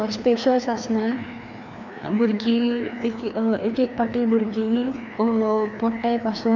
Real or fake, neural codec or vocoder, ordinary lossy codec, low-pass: fake; codec, 16 kHz, 2 kbps, FreqCodec, larger model; none; 7.2 kHz